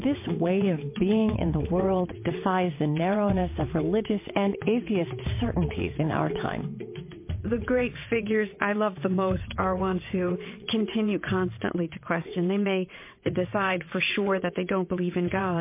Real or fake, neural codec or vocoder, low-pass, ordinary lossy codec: fake; vocoder, 22.05 kHz, 80 mel bands, WaveNeXt; 3.6 kHz; MP3, 24 kbps